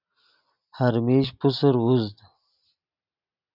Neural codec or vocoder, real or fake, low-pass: none; real; 5.4 kHz